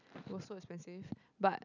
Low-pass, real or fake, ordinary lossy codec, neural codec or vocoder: 7.2 kHz; real; none; none